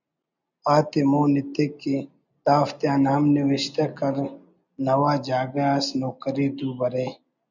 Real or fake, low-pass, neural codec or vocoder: real; 7.2 kHz; none